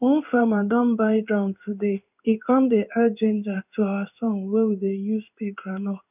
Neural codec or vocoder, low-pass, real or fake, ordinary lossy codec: codec, 16 kHz in and 24 kHz out, 1 kbps, XY-Tokenizer; 3.6 kHz; fake; none